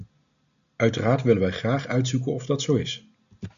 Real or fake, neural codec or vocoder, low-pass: real; none; 7.2 kHz